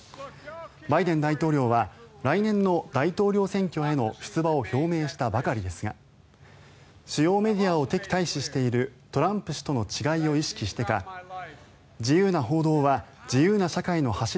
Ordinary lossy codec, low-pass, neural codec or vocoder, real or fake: none; none; none; real